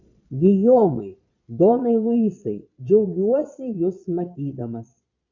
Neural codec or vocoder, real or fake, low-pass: vocoder, 22.05 kHz, 80 mel bands, Vocos; fake; 7.2 kHz